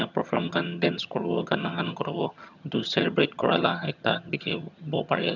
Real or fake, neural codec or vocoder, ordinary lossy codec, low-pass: fake; vocoder, 22.05 kHz, 80 mel bands, HiFi-GAN; none; 7.2 kHz